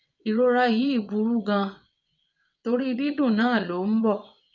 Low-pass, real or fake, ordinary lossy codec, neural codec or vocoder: 7.2 kHz; fake; none; vocoder, 22.05 kHz, 80 mel bands, WaveNeXt